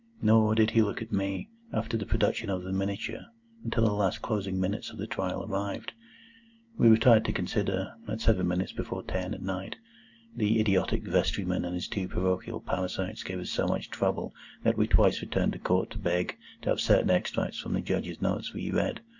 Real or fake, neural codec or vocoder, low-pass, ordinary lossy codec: real; none; 7.2 kHz; Opus, 64 kbps